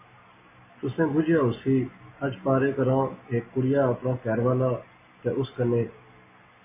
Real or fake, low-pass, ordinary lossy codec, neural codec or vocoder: real; 3.6 kHz; MP3, 16 kbps; none